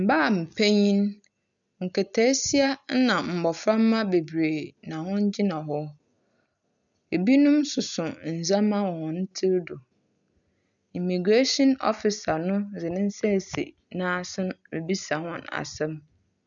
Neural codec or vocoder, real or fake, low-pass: none; real; 7.2 kHz